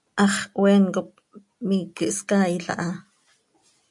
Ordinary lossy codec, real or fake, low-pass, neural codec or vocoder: MP3, 64 kbps; real; 10.8 kHz; none